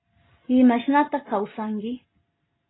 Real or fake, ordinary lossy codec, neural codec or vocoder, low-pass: real; AAC, 16 kbps; none; 7.2 kHz